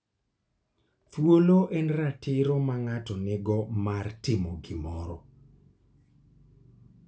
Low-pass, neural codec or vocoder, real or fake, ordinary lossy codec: none; none; real; none